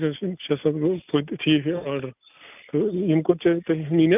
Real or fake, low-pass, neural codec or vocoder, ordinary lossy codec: real; 3.6 kHz; none; none